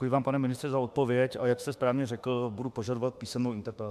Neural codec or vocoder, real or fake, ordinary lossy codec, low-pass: autoencoder, 48 kHz, 32 numbers a frame, DAC-VAE, trained on Japanese speech; fake; MP3, 96 kbps; 14.4 kHz